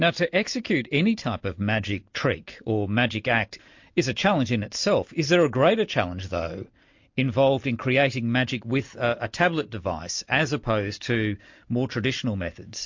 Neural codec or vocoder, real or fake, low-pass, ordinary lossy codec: none; real; 7.2 kHz; MP3, 48 kbps